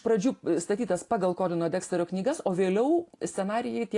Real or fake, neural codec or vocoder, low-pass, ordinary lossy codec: real; none; 10.8 kHz; AAC, 48 kbps